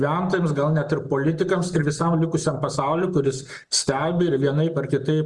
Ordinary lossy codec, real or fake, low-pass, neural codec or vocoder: Opus, 32 kbps; real; 10.8 kHz; none